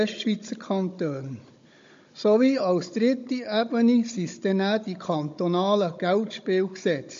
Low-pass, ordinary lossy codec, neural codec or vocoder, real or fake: 7.2 kHz; MP3, 48 kbps; codec, 16 kHz, 16 kbps, FunCodec, trained on Chinese and English, 50 frames a second; fake